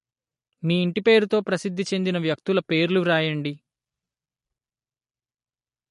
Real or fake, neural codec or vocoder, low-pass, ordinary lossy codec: real; none; 14.4 kHz; MP3, 48 kbps